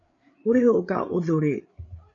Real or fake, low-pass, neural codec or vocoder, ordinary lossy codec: fake; 7.2 kHz; codec, 16 kHz, 8 kbps, FreqCodec, larger model; AAC, 32 kbps